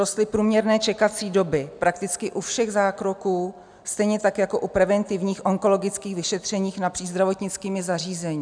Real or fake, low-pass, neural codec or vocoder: real; 9.9 kHz; none